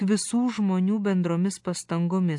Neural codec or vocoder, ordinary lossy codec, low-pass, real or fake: none; AAC, 64 kbps; 10.8 kHz; real